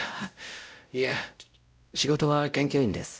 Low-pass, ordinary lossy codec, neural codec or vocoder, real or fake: none; none; codec, 16 kHz, 0.5 kbps, X-Codec, WavLM features, trained on Multilingual LibriSpeech; fake